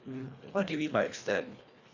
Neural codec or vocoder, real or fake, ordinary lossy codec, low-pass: codec, 24 kHz, 1.5 kbps, HILCodec; fake; Opus, 64 kbps; 7.2 kHz